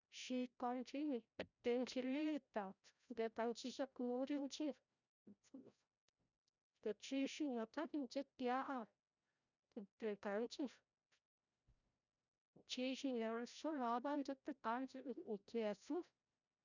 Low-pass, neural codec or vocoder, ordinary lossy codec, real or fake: 7.2 kHz; codec, 16 kHz, 0.5 kbps, FreqCodec, larger model; none; fake